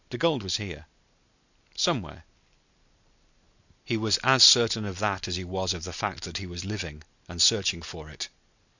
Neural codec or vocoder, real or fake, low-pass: none; real; 7.2 kHz